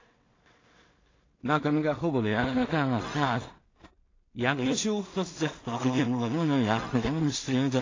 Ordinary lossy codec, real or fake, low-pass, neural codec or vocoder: AAC, 32 kbps; fake; 7.2 kHz; codec, 16 kHz in and 24 kHz out, 0.4 kbps, LongCat-Audio-Codec, two codebook decoder